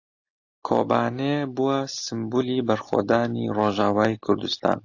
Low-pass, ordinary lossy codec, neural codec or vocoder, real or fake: 7.2 kHz; AAC, 48 kbps; none; real